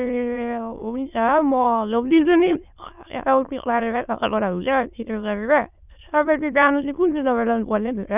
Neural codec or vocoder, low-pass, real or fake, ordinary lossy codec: autoencoder, 22.05 kHz, a latent of 192 numbers a frame, VITS, trained on many speakers; 3.6 kHz; fake; none